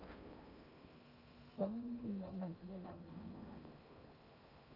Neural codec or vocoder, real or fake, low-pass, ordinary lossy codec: codec, 16 kHz in and 24 kHz out, 0.8 kbps, FocalCodec, streaming, 65536 codes; fake; 5.4 kHz; none